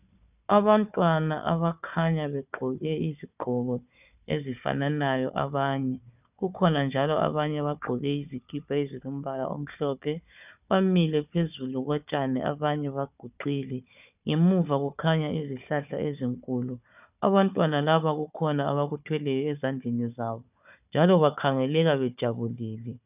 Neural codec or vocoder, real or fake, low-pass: codec, 16 kHz, 4 kbps, FunCodec, trained on Chinese and English, 50 frames a second; fake; 3.6 kHz